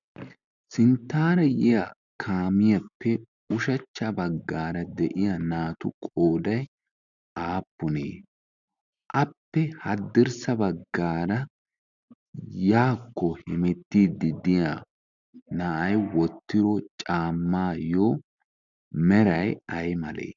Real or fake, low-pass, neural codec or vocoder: real; 7.2 kHz; none